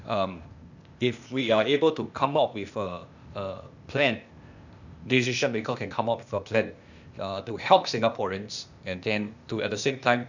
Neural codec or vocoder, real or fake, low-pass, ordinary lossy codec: codec, 16 kHz, 0.8 kbps, ZipCodec; fake; 7.2 kHz; none